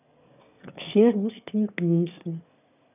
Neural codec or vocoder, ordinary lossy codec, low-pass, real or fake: autoencoder, 22.05 kHz, a latent of 192 numbers a frame, VITS, trained on one speaker; none; 3.6 kHz; fake